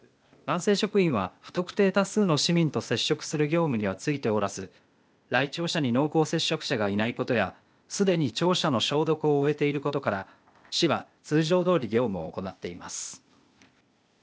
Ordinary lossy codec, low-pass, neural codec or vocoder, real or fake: none; none; codec, 16 kHz, 0.8 kbps, ZipCodec; fake